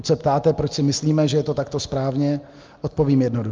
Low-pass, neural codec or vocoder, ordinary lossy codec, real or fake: 7.2 kHz; none; Opus, 24 kbps; real